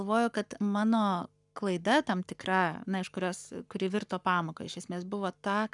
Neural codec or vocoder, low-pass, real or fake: codec, 44.1 kHz, 7.8 kbps, Pupu-Codec; 10.8 kHz; fake